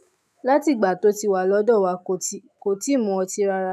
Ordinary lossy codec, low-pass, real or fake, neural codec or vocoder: none; 14.4 kHz; fake; autoencoder, 48 kHz, 128 numbers a frame, DAC-VAE, trained on Japanese speech